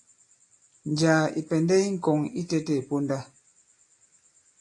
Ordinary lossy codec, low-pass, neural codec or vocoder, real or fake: AAC, 48 kbps; 10.8 kHz; none; real